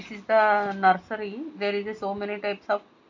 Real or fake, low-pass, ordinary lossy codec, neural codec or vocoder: real; 7.2 kHz; MP3, 48 kbps; none